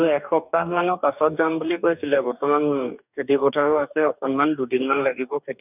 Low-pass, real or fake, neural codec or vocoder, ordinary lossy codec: 3.6 kHz; fake; codec, 44.1 kHz, 2.6 kbps, DAC; none